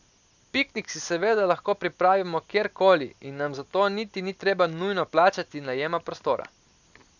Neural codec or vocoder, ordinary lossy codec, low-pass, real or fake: none; none; 7.2 kHz; real